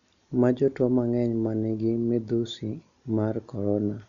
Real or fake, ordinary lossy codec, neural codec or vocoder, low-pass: real; none; none; 7.2 kHz